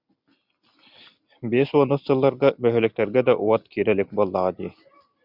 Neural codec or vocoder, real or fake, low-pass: none; real; 5.4 kHz